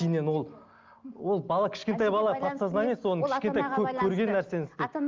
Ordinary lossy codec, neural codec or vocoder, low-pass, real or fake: Opus, 24 kbps; none; 7.2 kHz; real